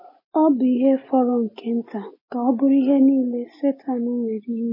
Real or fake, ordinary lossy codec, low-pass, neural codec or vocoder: real; MP3, 24 kbps; 5.4 kHz; none